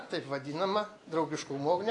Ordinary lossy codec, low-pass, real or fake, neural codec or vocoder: AAC, 48 kbps; 10.8 kHz; real; none